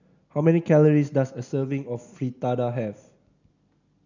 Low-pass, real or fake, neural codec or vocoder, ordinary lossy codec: 7.2 kHz; real; none; none